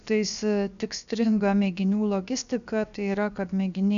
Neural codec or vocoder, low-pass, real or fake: codec, 16 kHz, 0.7 kbps, FocalCodec; 7.2 kHz; fake